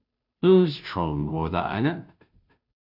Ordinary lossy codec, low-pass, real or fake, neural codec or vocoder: AAC, 48 kbps; 5.4 kHz; fake; codec, 16 kHz, 0.5 kbps, FunCodec, trained on Chinese and English, 25 frames a second